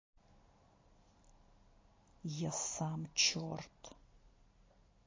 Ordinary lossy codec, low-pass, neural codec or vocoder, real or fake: MP3, 32 kbps; 7.2 kHz; none; real